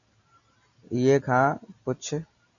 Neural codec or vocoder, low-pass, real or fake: none; 7.2 kHz; real